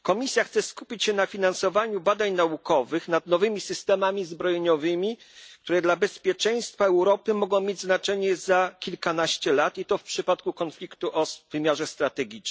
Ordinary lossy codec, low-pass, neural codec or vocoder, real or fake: none; none; none; real